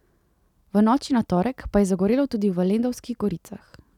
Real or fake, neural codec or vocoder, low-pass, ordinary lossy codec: real; none; 19.8 kHz; none